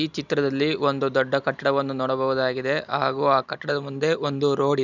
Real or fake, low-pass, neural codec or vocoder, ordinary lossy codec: real; 7.2 kHz; none; none